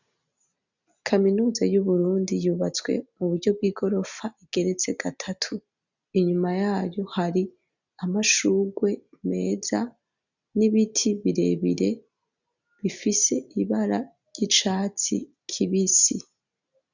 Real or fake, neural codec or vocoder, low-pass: real; none; 7.2 kHz